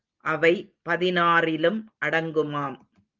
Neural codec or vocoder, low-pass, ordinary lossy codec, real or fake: none; 7.2 kHz; Opus, 32 kbps; real